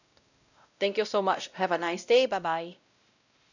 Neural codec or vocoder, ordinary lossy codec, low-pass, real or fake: codec, 16 kHz, 0.5 kbps, X-Codec, WavLM features, trained on Multilingual LibriSpeech; none; 7.2 kHz; fake